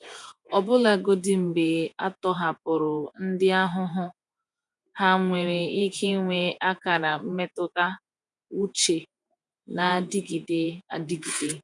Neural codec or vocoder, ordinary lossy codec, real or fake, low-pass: vocoder, 48 kHz, 128 mel bands, Vocos; none; fake; 10.8 kHz